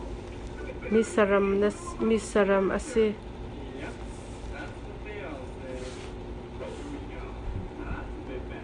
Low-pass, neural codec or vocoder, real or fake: 9.9 kHz; none; real